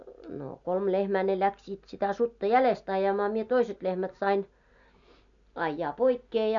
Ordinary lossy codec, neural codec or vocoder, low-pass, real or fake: none; none; 7.2 kHz; real